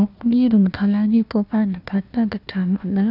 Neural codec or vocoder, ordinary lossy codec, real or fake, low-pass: codec, 16 kHz, 1 kbps, FunCodec, trained on Chinese and English, 50 frames a second; none; fake; 5.4 kHz